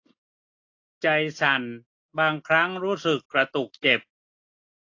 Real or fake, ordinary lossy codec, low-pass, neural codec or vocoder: real; AAC, 48 kbps; 7.2 kHz; none